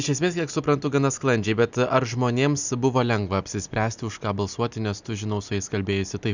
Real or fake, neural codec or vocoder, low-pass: real; none; 7.2 kHz